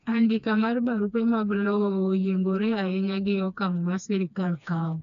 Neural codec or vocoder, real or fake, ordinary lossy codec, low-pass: codec, 16 kHz, 2 kbps, FreqCodec, smaller model; fake; none; 7.2 kHz